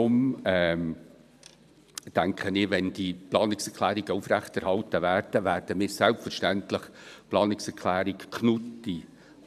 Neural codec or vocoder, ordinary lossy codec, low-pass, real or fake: vocoder, 44.1 kHz, 128 mel bands every 512 samples, BigVGAN v2; none; 14.4 kHz; fake